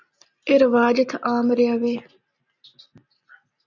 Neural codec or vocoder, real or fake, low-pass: none; real; 7.2 kHz